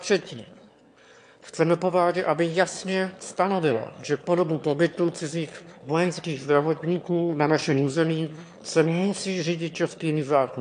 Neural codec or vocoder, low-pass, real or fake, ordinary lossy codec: autoencoder, 22.05 kHz, a latent of 192 numbers a frame, VITS, trained on one speaker; 9.9 kHz; fake; AAC, 64 kbps